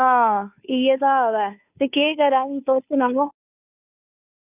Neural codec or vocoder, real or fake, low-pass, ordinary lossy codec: codec, 16 kHz, 2 kbps, FunCodec, trained on Chinese and English, 25 frames a second; fake; 3.6 kHz; none